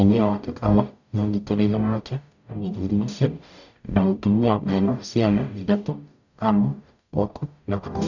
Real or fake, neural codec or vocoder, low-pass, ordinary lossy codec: fake; codec, 44.1 kHz, 0.9 kbps, DAC; 7.2 kHz; none